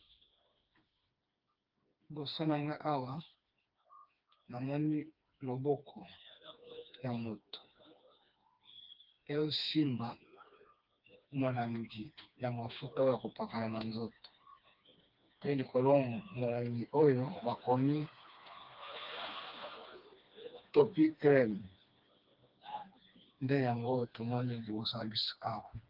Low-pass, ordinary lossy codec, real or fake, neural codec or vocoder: 5.4 kHz; Opus, 24 kbps; fake; codec, 16 kHz, 2 kbps, FreqCodec, smaller model